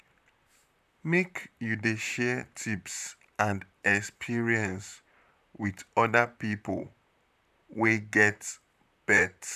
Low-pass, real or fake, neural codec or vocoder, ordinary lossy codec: 14.4 kHz; fake; vocoder, 44.1 kHz, 128 mel bands, Pupu-Vocoder; none